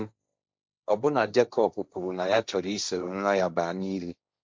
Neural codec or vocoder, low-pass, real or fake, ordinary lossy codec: codec, 16 kHz, 1.1 kbps, Voila-Tokenizer; none; fake; none